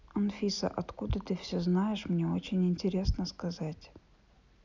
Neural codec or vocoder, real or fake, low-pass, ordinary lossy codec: none; real; 7.2 kHz; none